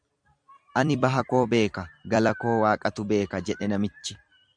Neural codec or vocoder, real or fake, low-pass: vocoder, 44.1 kHz, 128 mel bands every 256 samples, BigVGAN v2; fake; 9.9 kHz